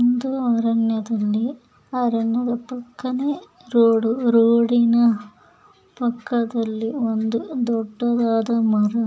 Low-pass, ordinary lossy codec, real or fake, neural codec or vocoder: none; none; real; none